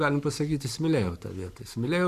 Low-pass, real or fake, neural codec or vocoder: 14.4 kHz; fake; codec, 44.1 kHz, 7.8 kbps, Pupu-Codec